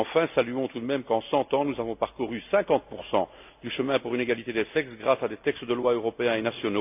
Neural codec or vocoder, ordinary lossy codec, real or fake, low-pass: none; AAC, 32 kbps; real; 3.6 kHz